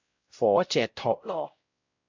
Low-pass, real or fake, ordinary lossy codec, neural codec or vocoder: 7.2 kHz; fake; AAC, 48 kbps; codec, 16 kHz, 0.5 kbps, X-Codec, WavLM features, trained on Multilingual LibriSpeech